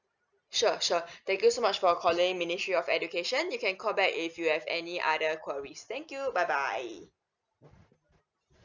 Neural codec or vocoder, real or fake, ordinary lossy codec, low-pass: none; real; none; 7.2 kHz